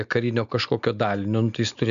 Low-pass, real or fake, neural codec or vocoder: 7.2 kHz; real; none